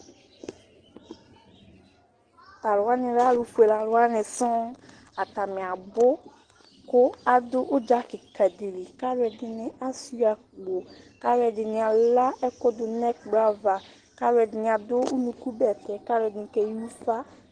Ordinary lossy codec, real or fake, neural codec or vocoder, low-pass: Opus, 16 kbps; real; none; 9.9 kHz